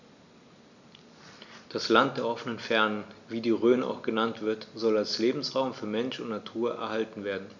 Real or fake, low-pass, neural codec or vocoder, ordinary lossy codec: real; 7.2 kHz; none; none